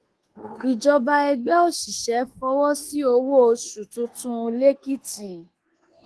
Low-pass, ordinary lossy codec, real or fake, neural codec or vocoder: 10.8 kHz; Opus, 16 kbps; fake; codec, 24 kHz, 1.2 kbps, DualCodec